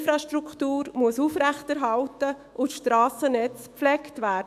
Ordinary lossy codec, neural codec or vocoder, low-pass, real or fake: MP3, 96 kbps; autoencoder, 48 kHz, 128 numbers a frame, DAC-VAE, trained on Japanese speech; 14.4 kHz; fake